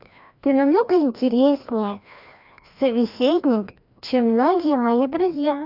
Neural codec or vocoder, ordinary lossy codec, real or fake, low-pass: codec, 16 kHz, 1 kbps, FreqCodec, larger model; none; fake; 5.4 kHz